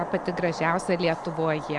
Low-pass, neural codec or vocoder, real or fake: 10.8 kHz; none; real